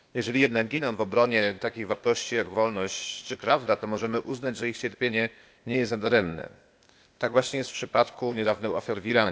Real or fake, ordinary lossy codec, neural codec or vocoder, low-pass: fake; none; codec, 16 kHz, 0.8 kbps, ZipCodec; none